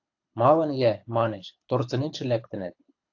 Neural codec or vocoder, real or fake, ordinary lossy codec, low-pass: codec, 24 kHz, 6 kbps, HILCodec; fake; AAC, 48 kbps; 7.2 kHz